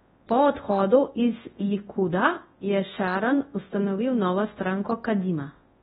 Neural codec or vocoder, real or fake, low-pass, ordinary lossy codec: codec, 24 kHz, 0.9 kbps, WavTokenizer, large speech release; fake; 10.8 kHz; AAC, 16 kbps